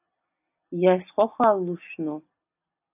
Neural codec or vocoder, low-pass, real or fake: none; 3.6 kHz; real